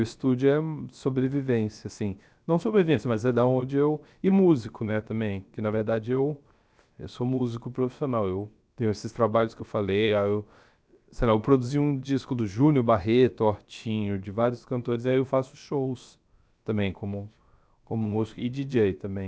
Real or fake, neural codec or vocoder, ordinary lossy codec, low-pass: fake; codec, 16 kHz, 0.7 kbps, FocalCodec; none; none